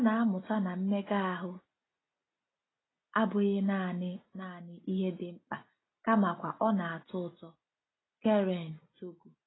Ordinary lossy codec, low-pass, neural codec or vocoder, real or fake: AAC, 16 kbps; 7.2 kHz; none; real